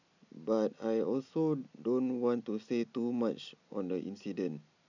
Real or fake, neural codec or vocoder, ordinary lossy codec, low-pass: real; none; none; 7.2 kHz